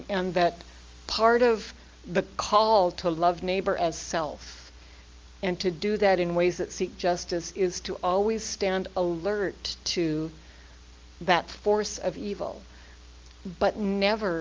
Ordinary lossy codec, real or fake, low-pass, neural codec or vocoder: Opus, 32 kbps; real; 7.2 kHz; none